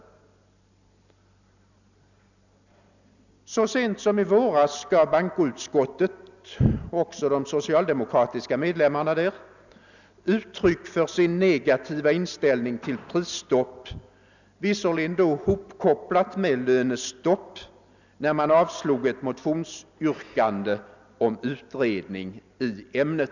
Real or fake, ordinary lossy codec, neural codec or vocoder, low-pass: real; none; none; 7.2 kHz